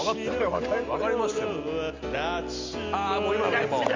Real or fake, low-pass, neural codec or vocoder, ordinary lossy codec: real; 7.2 kHz; none; MP3, 64 kbps